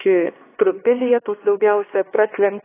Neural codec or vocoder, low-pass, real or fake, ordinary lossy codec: codec, 16 kHz, 2 kbps, X-Codec, HuBERT features, trained on LibriSpeech; 3.6 kHz; fake; AAC, 16 kbps